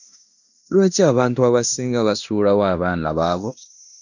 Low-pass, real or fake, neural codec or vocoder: 7.2 kHz; fake; codec, 16 kHz in and 24 kHz out, 0.9 kbps, LongCat-Audio-Codec, fine tuned four codebook decoder